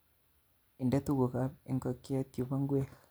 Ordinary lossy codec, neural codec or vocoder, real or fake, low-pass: none; none; real; none